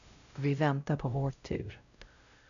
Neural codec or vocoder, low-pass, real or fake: codec, 16 kHz, 0.5 kbps, X-Codec, WavLM features, trained on Multilingual LibriSpeech; 7.2 kHz; fake